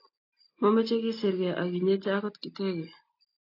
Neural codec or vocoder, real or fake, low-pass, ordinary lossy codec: none; real; 5.4 kHz; AAC, 32 kbps